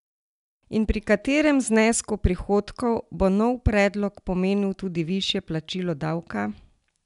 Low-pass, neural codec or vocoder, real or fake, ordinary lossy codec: 10.8 kHz; none; real; none